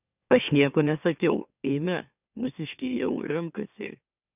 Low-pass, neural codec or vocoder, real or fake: 3.6 kHz; autoencoder, 44.1 kHz, a latent of 192 numbers a frame, MeloTTS; fake